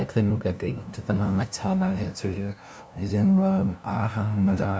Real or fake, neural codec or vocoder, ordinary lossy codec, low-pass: fake; codec, 16 kHz, 0.5 kbps, FunCodec, trained on LibriTTS, 25 frames a second; none; none